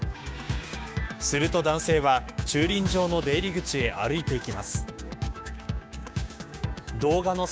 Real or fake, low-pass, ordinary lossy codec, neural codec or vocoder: fake; none; none; codec, 16 kHz, 6 kbps, DAC